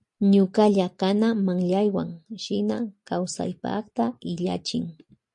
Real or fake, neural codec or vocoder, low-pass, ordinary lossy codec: real; none; 10.8 kHz; MP3, 48 kbps